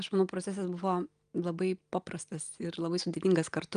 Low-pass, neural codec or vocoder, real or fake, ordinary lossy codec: 9.9 kHz; none; real; Opus, 32 kbps